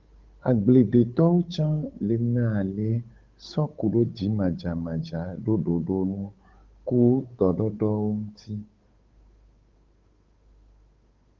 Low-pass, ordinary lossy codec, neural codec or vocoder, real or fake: 7.2 kHz; Opus, 32 kbps; codec, 16 kHz, 8 kbps, FunCodec, trained on Chinese and English, 25 frames a second; fake